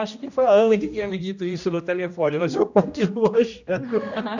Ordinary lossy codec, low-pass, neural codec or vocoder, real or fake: none; 7.2 kHz; codec, 16 kHz, 1 kbps, X-Codec, HuBERT features, trained on general audio; fake